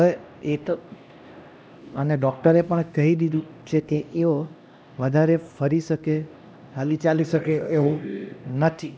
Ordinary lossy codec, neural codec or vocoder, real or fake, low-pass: none; codec, 16 kHz, 1 kbps, X-Codec, HuBERT features, trained on LibriSpeech; fake; none